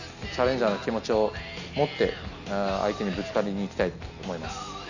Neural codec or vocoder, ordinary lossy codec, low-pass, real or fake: none; none; 7.2 kHz; real